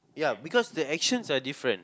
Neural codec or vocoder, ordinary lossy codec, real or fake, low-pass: none; none; real; none